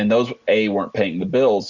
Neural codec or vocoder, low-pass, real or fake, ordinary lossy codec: none; 7.2 kHz; real; Opus, 64 kbps